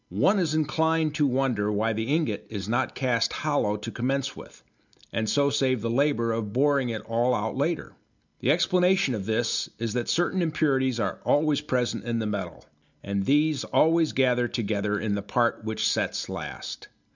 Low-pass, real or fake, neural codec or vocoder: 7.2 kHz; real; none